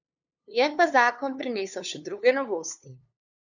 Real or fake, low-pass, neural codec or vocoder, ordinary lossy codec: fake; 7.2 kHz; codec, 16 kHz, 2 kbps, FunCodec, trained on LibriTTS, 25 frames a second; none